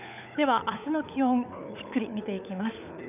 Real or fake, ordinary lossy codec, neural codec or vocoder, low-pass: fake; none; codec, 16 kHz, 16 kbps, FunCodec, trained on LibriTTS, 50 frames a second; 3.6 kHz